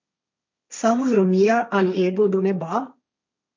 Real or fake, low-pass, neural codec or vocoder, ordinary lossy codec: fake; 7.2 kHz; codec, 16 kHz, 1.1 kbps, Voila-Tokenizer; MP3, 48 kbps